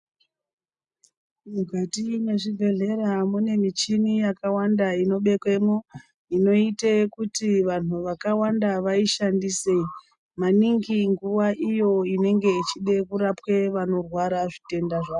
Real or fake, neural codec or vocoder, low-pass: real; none; 10.8 kHz